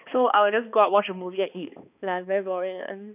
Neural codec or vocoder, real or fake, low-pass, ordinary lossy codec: codec, 16 kHz, 2 kbps, X-Codec, HuBERT features, trained on balanced general audio; fake; 3.6 kHz; none